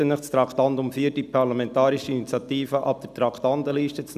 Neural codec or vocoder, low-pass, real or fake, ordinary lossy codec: none; 14.4 kHz; real; none